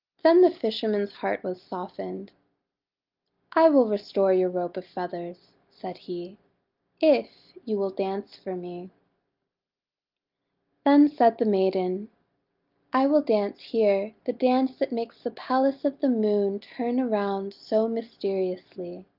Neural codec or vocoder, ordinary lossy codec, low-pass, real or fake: none; Opus, 24 kbps; 5.4 kHz; real